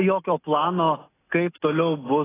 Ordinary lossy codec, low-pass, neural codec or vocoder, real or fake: AAC, 16 kbps; 3.6 kHz; none; real